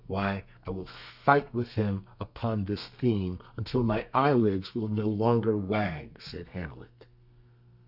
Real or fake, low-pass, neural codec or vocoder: fake; 5.4 kHz; codec, 32 kHz, 1.9 kbps, SNAC